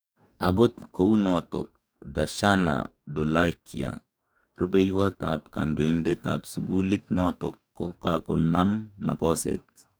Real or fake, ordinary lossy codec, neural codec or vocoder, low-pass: fake; none; codec, 44.1 kHz, 2.6 kbps, DAC; none